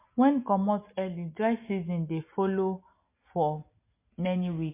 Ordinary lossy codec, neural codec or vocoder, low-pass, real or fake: MP3, 24 kbps; none; 3.6 kHz; real